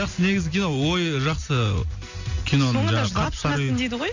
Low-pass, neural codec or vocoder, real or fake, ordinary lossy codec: 7.2 kHz; none; real; none